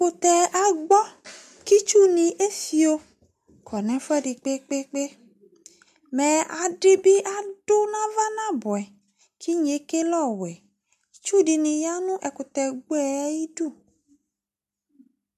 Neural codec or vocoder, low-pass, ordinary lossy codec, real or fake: none; 14.4 kHz; MP3, 96 kbps; real